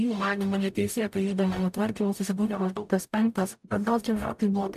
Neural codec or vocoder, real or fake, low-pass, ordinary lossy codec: codec, 44.1 kHz, 0.9 kbps, DAC; fake; 14.4 kHz; AAC, 96 kbps